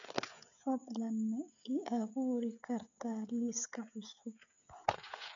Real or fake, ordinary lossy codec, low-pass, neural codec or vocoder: fake; none; 7.2 kHz; codec, 16 kHz, 16 kbps, FreqCodec, smaller model